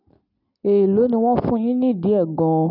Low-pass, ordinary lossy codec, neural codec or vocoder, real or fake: 5.4 kHz; none; none; real